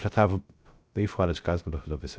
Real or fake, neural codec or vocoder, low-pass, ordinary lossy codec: fake; codec, 16 kHz, 0.3 kbps, FocalCodec; none; none